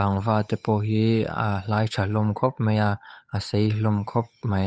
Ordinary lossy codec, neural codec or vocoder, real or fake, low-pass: none; codec, 16 kHz, 8 kbps, FunCodec, trained on Chinese and English, 25 frames a second; fake; none